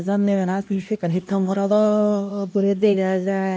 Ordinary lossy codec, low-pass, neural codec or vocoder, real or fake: none; none; codec, 16 kHz, 1 kbps, X-Codec, HuBERT features, trained on LibriSpeech; fake